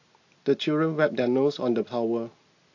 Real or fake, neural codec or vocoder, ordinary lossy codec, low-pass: real; none; AAC, 48 kbps; 7.2 kHz